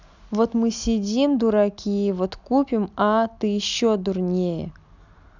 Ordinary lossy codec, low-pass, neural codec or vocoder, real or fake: none; 7.2 kHz; none; real